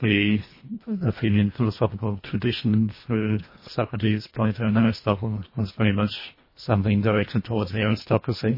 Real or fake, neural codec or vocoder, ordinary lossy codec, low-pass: fake; codec, 24 kHz, 1.5 kbps, HILCodec; MP3, 24 kbps; 5.4 kHz